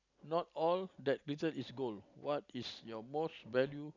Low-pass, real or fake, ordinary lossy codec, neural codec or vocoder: 7.2 kHz; real; none; none